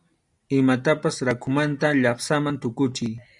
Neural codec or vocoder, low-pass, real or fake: none; 10.8 kHz; real